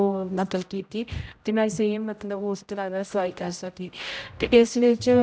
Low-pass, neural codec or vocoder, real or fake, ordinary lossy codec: none; codec, 16 kHz, 0.5 kbps, X-Codec, HuBERT features, trained on general audio; fake; none